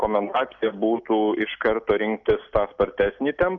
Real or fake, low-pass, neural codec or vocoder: real; 7.2 kHz; none